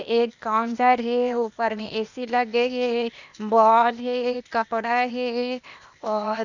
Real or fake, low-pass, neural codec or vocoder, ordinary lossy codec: fake; 7.2 kHz; codec, 16 kHz, 0.8 kbps, ZipCodec; none